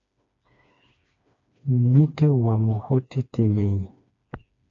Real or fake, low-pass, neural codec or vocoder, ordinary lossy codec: fake; 7.2 kHz; codec, 16 kHz, 2 kbps, FreqCodec, smaller model; AAC, 48 kbps